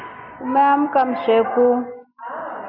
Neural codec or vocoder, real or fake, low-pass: none; real; 5.4 kHz